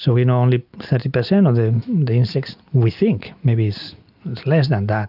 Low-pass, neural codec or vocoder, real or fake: 5.4 kHz; none; real